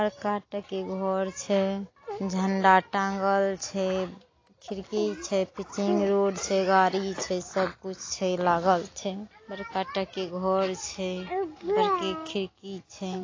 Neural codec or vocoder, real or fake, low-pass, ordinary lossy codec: none; real; 7.2 kHz; AAC, 32 kbps